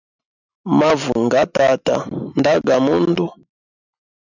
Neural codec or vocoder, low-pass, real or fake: none; 7.2 kHz; real